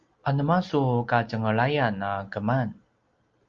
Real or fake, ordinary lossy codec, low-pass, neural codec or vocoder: real; Opus, 32 kbps; 7.2 kHz; none